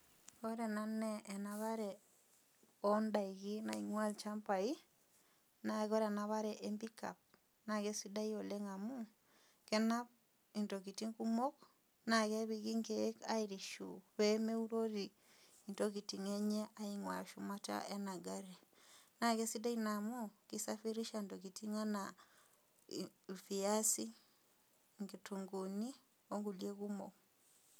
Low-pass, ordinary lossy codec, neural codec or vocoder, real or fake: none; none; none; real